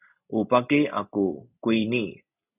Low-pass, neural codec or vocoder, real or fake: 3.6 kHz; none; real